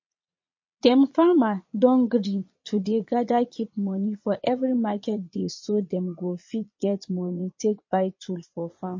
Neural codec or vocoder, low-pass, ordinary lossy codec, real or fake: vocoder, 22.05 kHz, 80 mel bands, Vocos; 7.2 kHz; MP3, 32 kbps; fake